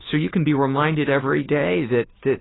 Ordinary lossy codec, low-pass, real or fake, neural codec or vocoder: AAC, 16 kbps; 7.2 kHz; fake; codec, 16 kHz, 2 kbps, FunCodec, trained on Chinese and English, 25 frames a second